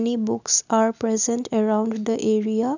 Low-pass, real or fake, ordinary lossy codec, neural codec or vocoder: 7.2 kHz; real; none; none